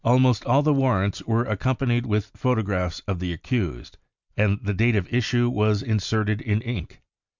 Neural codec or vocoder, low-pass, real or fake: none; 7.2 kHz; real